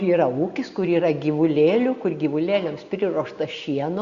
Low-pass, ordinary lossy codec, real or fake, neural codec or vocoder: 7.2 kHz; Opus, 64 kbps; real; none